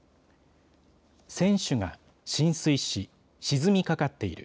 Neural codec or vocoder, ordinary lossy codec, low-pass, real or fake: none; none; none; real